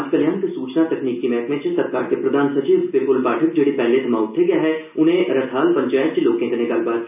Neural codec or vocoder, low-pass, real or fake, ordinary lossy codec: none; 3.6 kHz; real; none